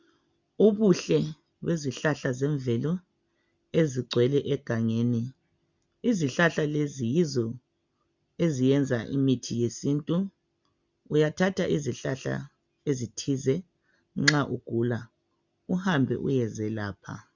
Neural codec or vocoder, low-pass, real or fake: none; 7.2 kHz; real